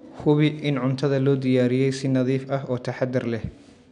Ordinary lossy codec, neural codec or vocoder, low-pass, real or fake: none; none; 10.8 kHz; real